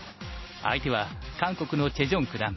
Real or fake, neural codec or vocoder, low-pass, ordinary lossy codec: real; none; 7.2 kHz; MP3, 24 kbps